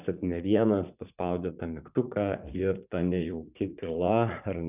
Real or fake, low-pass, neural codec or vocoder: fake; 3.6 kHz; vocoder, 44.1 kHz, 80 mel bands, Vocos